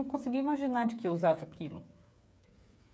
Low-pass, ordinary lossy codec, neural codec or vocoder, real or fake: none; none; codec, 16 kHz, 8 kbps, FreqCodec, smaller model; fake